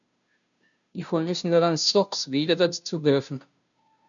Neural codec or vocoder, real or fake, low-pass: codec, 16 kHz, 0.5 kbps, FunCodec, trained on Chinese and English, 25 frames a second; fake; 7.2 kHz